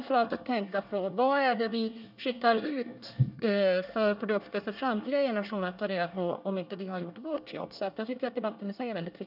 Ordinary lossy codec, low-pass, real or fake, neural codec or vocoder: none; 5.4 kHz; fake; codec, 24 kHz, 1 kbps, SNAC